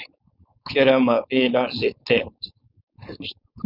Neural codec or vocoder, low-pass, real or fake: codec, 16 kHz, 4.8 kbps, FACodec; 5.4 kHz; fake